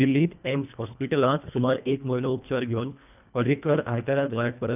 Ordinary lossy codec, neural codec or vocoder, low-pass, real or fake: none; codec, 24 kHz, 1.5 kbps, HILCodec; 3.6 kHz; fake